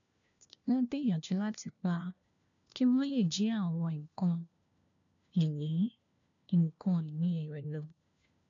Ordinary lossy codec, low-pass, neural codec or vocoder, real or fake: MP3, 96 kbps; 7.2 kHz; codec, 16 kHz, 1 kbps, FunCodec, trained on LibriTTS, 50 frames a second; fake